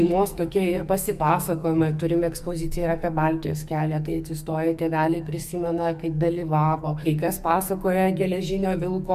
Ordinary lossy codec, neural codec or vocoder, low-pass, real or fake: MP3, 96 kbps; codec, 44.1 kHz, 2.6 kbps, SNAC; 14.4 kHz; fake